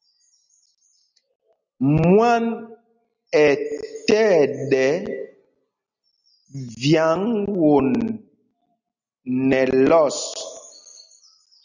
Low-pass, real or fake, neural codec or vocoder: 7.2 kHz; real; none